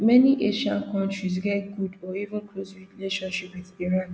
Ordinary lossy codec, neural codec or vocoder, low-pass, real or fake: none; none; none; real